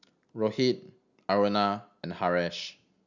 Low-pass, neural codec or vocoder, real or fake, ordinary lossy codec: 7.2 kHz; none; real; none